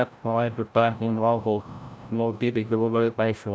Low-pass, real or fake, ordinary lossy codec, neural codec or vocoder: none; fake; none; codec, 16 kHz, 0.5 kbps, FreqCodec, larger model